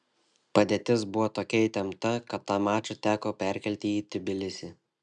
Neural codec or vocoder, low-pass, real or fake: none; 9.9 kHz; real